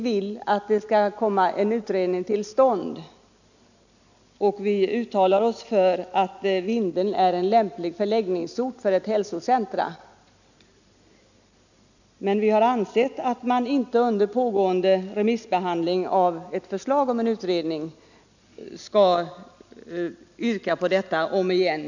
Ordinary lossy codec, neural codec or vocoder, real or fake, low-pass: none; none; real; 7.2 kHz